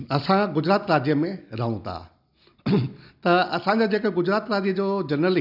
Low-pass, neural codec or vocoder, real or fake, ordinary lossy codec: 5.4 kHz; none; real; none